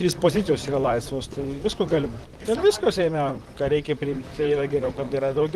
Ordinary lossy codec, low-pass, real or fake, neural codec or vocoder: Opus, 24 kbps; 14.4 kHz; fake; vocoder, 44.1 kHz, 128 mel bands, Pupu-Vocoder